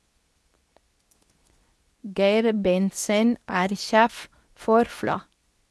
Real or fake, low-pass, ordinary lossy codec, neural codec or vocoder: fake; none; none; codec, 24 kHz, 0.9 kbps, WavTokenizer, medium speech release version 2